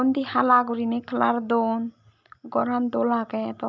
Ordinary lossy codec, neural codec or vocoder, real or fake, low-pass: none; none; real; none